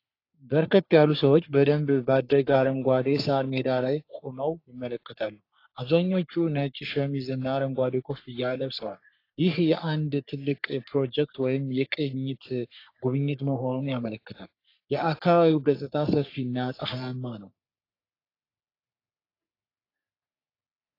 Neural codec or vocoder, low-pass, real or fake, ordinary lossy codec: codec, 44.1 kHz, 3.4 kbps, Pupu-Codec; 5.4 kHz; fake; AAC, 32 kbps